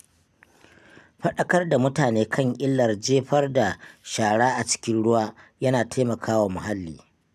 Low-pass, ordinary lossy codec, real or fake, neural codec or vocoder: 14.4 kHz; none; real; none